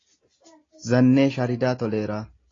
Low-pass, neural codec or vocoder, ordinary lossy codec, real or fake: 7.2 kHz; none; AAC, 32 kbps; real